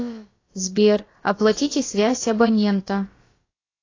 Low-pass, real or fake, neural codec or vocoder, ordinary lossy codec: 7.2 kHz; fake; codec, 16 kHz, about 1 kbps, DyCAST, with the encoder's durations; AAC, 32 kbps